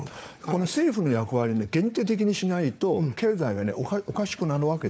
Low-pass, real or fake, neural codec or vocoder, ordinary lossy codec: none; fake; codec, 16 kHz, 4 kbps, FunCodec, trained on Chinese and English, 50 frames a second; none